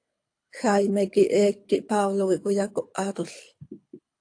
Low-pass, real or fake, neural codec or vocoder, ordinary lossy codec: 9.9 kHz; fake; codec, 24 kHz, 6 kbps, HILCodec; AAC, 48 kbps